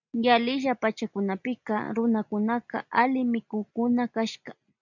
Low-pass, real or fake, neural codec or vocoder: 7.2 kHz; real; none